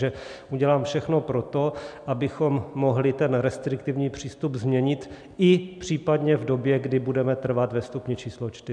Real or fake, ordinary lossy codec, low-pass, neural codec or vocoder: real; MP3, 96 kbps; 9.9 kHz; none